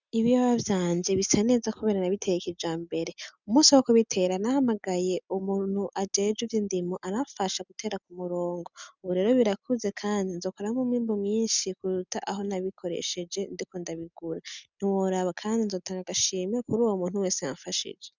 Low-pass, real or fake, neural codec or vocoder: 7.2 kHz; real; none